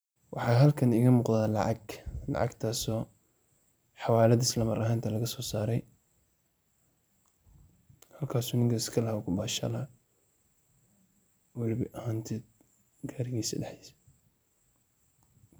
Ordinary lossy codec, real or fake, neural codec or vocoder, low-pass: none; fake; vocoder, 44.1 kHz, 128 mel bands every 512 samples, BigVGAN v2; none